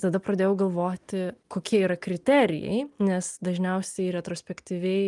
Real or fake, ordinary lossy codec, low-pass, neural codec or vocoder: real; Opus, 32 kbps; 10.8 kHz; none